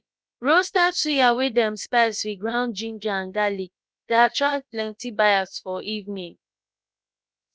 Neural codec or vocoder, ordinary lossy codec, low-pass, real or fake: codec, 16 kHz, about 1 kbps, DyCAST, with the encoder's durations; none; none; fake